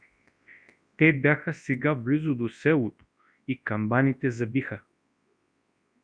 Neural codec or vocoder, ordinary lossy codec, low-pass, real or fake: codec, 24 kHz, 0.9 kbps, WavTokenizer, large speech release; Opus, 64 kbps; 9.9 kHz; fake